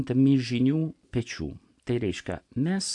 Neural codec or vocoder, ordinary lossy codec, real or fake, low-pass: none; AAC, 64 kbps; real; 10.8 kHz